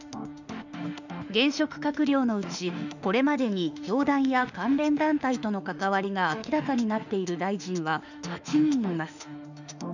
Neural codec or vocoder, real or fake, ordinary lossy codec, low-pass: autoencoder, 48 kHz, 32 numbers a frame, DAC-VAE, trained on Japanese speech; fake; none; 7.2 kHz